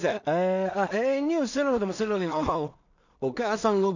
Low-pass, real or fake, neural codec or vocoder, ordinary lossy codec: 7.2 kHz; fake; codec, 16 kHz in and 24 kHz out, 0.4 kbps, LongCat-Audio-Codec, two codebook decoder; none